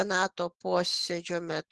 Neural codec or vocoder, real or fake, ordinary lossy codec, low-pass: autoencoder, 48 kHz, 128 numbers a frame, DAC-VAE, trained on Japanese speech; fake; Opus, 16 kbps; 10.8 kHz